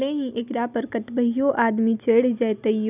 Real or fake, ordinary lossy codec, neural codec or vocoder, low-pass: real; none; none; 3.6 kHz